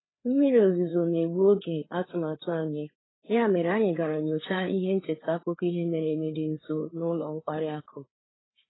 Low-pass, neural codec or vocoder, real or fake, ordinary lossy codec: 7.2 kHz; codec, 16 kHz, 4 kbps, FreqCodec, larger model; fake; AAC, 16 kbps